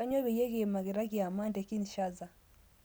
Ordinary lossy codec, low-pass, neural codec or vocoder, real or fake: none; none; none; real